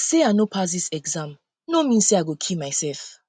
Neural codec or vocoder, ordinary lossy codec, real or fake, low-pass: none; none; real; 9.9 kHz